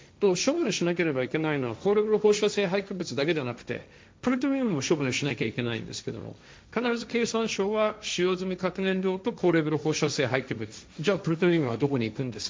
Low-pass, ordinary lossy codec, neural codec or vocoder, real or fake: none; none; codec, 16 kHz, 1.1 kbps, Voila-Tokenizer; fake